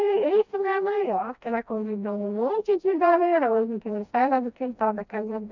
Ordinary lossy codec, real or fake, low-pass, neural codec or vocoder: none; fake; 7.2 kHz; codec, 16 kHz, 1 kbps, FreqCodec, smaller model